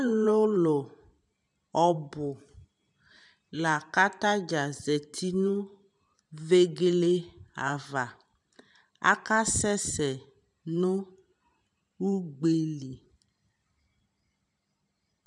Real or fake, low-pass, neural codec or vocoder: fake; 10.8 kHz; vocoder, 44.1 kHz, 128 mel bands every 512 samples, BigVGAN v2